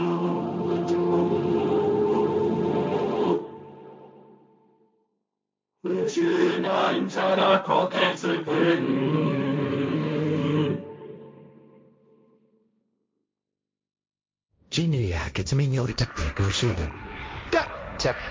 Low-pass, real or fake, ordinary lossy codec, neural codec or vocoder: none; fake; none; codec, 16 kHz, 1.1 kbps, Voila-Tokenizer